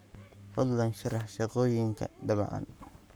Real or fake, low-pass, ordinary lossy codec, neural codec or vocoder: fake; none; none; codec, 44.1 kHz, 7.8 kbps, Pupu-Codec